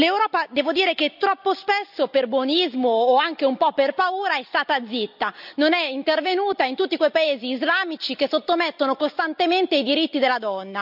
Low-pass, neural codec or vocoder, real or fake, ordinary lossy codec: 5.4 kHz; none; real; none